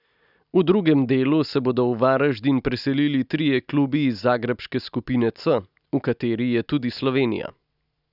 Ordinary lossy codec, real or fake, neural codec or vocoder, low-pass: none; real; none; 5.4 kHz